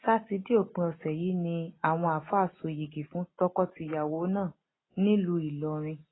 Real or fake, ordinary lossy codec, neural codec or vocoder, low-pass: real; AAC, 16 kbps; none; 7.2 kHz